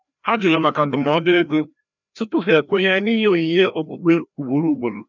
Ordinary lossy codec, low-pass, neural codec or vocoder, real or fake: none; 7.2 kHz; codec, 16 kHz, 1 kbps, FreqCodec, larger model; fake